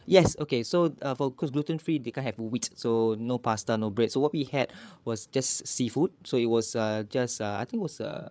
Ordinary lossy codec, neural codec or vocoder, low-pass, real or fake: none; codec, 16 kHz, 8 kbps, FreqCodec, larger model; none; fake